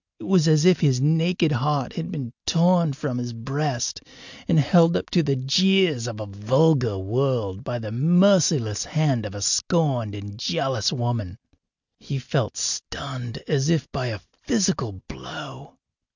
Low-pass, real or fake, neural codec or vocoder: 7.2 kHz; real; none